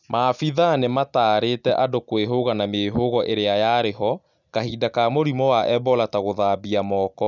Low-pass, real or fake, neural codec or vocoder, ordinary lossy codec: 7.2 kHz; real; none; none